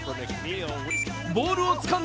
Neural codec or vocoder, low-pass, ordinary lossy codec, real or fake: none; none; none; real